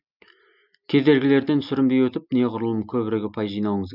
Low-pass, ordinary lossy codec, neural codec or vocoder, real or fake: 5.4 kHz; none; none; real